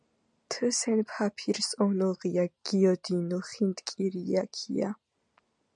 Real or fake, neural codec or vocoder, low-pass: real; none; 9.9 kHz